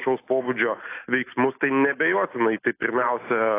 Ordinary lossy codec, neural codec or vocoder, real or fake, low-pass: AAC, 24 kbps; none; real; 3.6 kHz